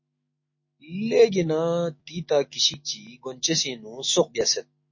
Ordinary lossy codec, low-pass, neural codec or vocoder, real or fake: MP3, 32 kbps; 7.2 kHz; autoencoder, 48 kHz, 128 numbers a frame, DAC-VAE, trained on Japanese speech; fake